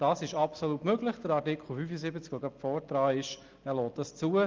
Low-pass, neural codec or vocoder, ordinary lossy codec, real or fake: 7.2 kHz; none; Opus, 32 kbps; real